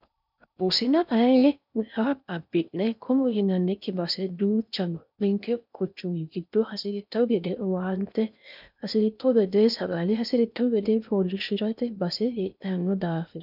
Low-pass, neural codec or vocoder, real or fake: 5.4 kHz; codec, 16 kHz in and 24 kHz out, 0.6 kbps, FocalCodec, streaming, 4096 codes; fake